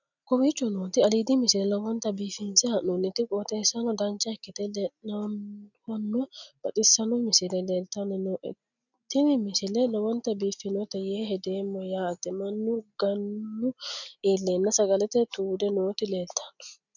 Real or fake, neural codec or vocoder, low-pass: real; none; 7.2 kHz